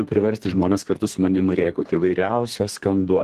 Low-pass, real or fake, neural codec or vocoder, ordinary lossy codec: 14.4 kHz; fake; codec, 32 kHz, 1.9 kbps, SNAC; Opus, 16 kbps